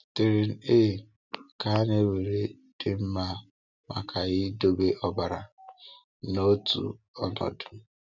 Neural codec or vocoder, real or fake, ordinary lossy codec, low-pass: none; real; none; 7.2 kHz